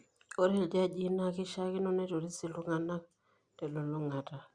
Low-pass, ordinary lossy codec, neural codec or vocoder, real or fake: 9.9 kHz; Opus, 64 kbps; none; real